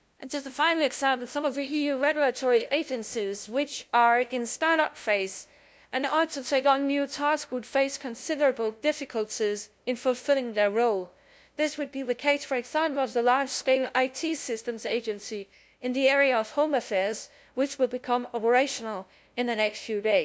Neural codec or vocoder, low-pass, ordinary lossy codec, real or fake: codec, 16 kHz, 0.5 kbps, FunCodec, trained on LibriTTS, 25 frames a second; none; none; fake